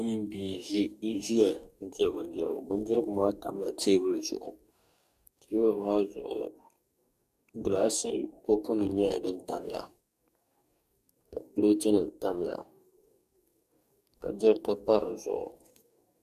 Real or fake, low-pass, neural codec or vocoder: fake; 14.4 kHz; codec, 44.1 kHz, 2.6 kbps, DAC